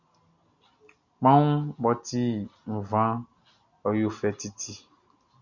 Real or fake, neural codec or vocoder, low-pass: real; none; 7.2 kHz